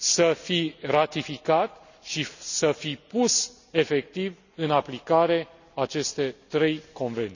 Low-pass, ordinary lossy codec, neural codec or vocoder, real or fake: 7.2 kHz; none; none; real